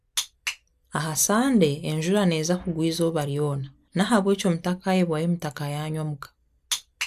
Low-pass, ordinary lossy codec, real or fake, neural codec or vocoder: 14.4 kHz; AAC, 96 kbps; real; none